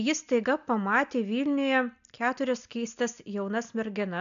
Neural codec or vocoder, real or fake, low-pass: none; real; 7.2 kHz